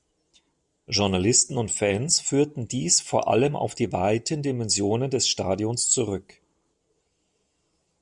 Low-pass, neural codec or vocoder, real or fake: 10.8 kHz; none; real